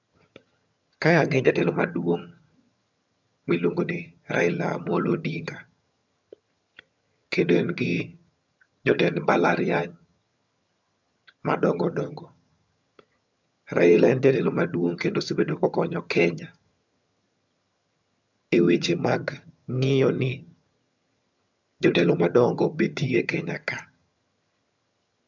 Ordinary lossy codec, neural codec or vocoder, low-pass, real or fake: MP3, 64 kbps; vocoder, 22.05 kHz, 80 mel bands, HiFi-GAN; 7.2 kHz; fake